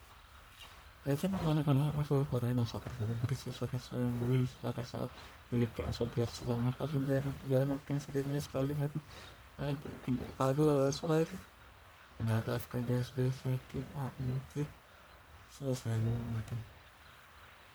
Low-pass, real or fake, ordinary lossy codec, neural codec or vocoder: none; fake; none; codec, 44.1 kHz, 1.7 kbps, Pupu-Codec